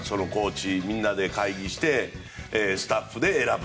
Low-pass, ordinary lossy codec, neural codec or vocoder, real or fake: none; none; none; real